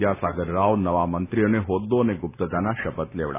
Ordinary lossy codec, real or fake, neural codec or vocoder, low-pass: none; real; none; 3.6 kHz